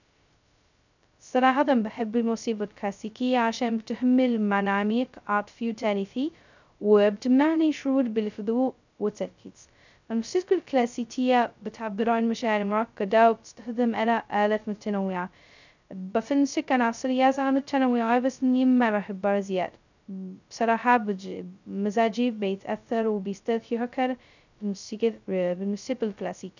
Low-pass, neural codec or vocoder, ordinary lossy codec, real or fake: 7.2 kHz; codec, 16 kHz, 0.2 kbps, FocalCodec; none; fake